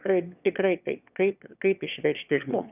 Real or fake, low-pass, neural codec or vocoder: fake; 3.6 kHz; autoencoder, 22.05 kHz, a latent of 192 numbers a frame, VITS, trained on one speaker